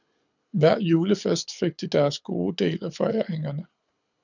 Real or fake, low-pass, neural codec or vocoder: fake; 7.2 kHz; codec, 24 kHz, 6 kbps, HILCodec